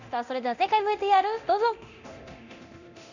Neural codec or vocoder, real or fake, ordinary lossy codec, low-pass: codec, 24 kHz, 0.9 kbps, DualCodec; fake; none; 7.2 kHz